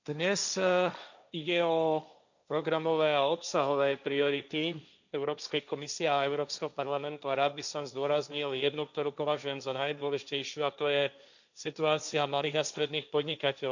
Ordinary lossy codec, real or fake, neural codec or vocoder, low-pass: none; fake; codec, 16 kHz, 1.1 kbps, Voila-Tokenizer; 7.2 kHz